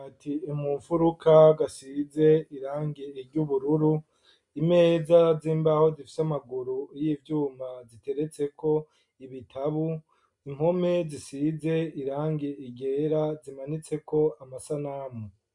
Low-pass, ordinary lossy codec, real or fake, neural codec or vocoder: 10.8 kHz; MP3, 64 kbps; real; none